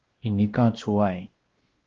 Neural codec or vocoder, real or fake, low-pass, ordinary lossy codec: codec, 16 kHz, 1 kbps, X-Codec, WavLM features, trained on Multilingual LibriSpeech; fake; 7.2 kHz; Opus, 16 kbps